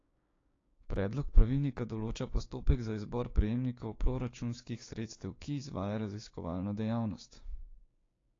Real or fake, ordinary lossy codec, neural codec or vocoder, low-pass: fake; AAC, 32 kbps; codec, 16 kHz, 6 kbps, DAC; 7.2 kHz